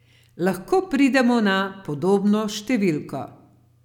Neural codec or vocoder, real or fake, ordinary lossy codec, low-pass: none; real; none; 19.8 kHz